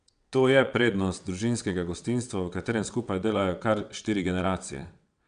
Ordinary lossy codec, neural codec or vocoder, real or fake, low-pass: none; vocoder, 22.05 kHz, 80 mel bands, WaveNeXt; fake; 9.9 kHz